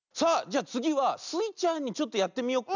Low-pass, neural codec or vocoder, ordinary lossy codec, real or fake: 7.2 kHz; none; none; real